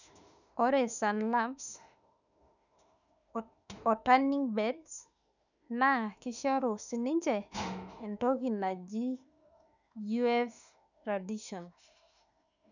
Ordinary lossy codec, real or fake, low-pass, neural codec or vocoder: none; fake; 7.2 kHz; autoencoder, 48 kHz, 32 numbers a frame, DAC-VAE, trained on Japanese speech